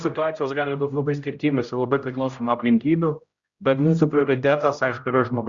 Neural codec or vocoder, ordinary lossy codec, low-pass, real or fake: codec, 16 kHz, 0.5 kbps, X-Codec, HuBERT features, trained on general audio; Opus, 64 kbps; 7.2 kHz; fake